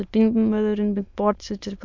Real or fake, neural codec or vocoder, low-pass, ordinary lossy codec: fake; autoencoder, 22.05 kHz, a latent of 192 numbers a frame, VITS, trained on many speakers; 7.2 kHz; MP3, 64 kbps